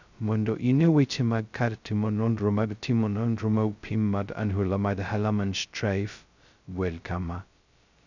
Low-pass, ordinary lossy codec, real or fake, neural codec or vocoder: 7.2 kHz; none; fake; codec, 16 kHz, 0.2 kbps, FocalCodec